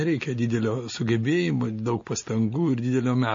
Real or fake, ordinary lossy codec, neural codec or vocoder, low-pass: real; MP3, 32 kbps; none; 7.2 kHz